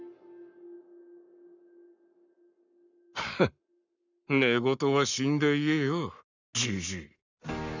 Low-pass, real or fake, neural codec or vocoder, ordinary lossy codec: 7.2 kHz; fake; codec, 44.1 kHz, 7.8 kbps, Pupu-Codec; none